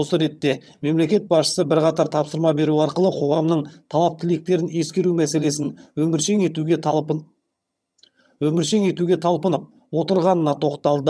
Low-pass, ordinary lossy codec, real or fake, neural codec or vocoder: none; none; fake; vocoder, 22.05 kHz, 80 mel bands, HiFi-GAN